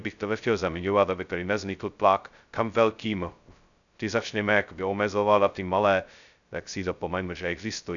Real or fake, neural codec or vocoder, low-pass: fake; codec, 16 kHz, 0.2 kbps, FocalCodec; 7.2 kHz